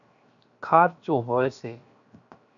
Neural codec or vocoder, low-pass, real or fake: codec, 16 kHz, 0.7 kbps, FocalCodec; 7.2 kHz; fake